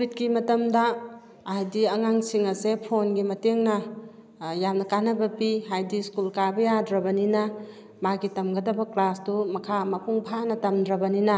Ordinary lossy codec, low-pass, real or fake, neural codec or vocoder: none; none; real; none